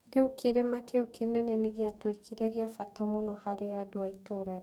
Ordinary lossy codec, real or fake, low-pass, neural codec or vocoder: none; fake; 19.8 kHz; codec, 44.1 kHz, 2.6 kbps, DAC